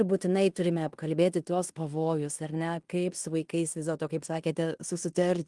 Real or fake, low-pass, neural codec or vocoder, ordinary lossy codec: fake; 10.8 kHz; codec, 16 kHz in and 24 kHz out, 0.9 kbps, LongCat-Audio-Codec, fine tuned four codebook decoder; Opus, 24 kbps